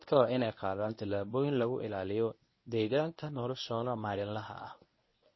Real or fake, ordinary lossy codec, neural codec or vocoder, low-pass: fake; MP3, 24 kbps; codec, 24 kHz, 0.9 kbps, WavTokenizer, medium speech release version 1; 7.2 kHz